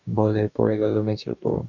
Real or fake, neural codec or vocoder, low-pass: fake; codec, 44.1 kHz, 2.6 kbps, DAC; 7.2 kHz